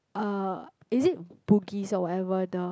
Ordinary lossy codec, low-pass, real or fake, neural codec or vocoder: none; none; real; none